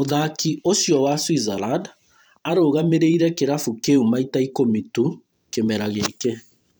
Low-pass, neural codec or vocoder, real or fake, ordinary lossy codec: none; vocoder, 44.1 kHz, 128 mel bands every 512 samples, BigVGAN v2; fake; none